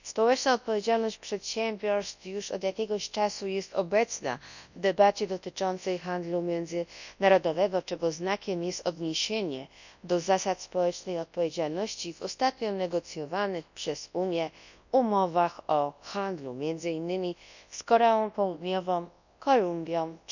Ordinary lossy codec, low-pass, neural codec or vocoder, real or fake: none; 7.2 kHz; codec, 24 kHz, 0.9 kbps, WavTokenizer, large speech release; fake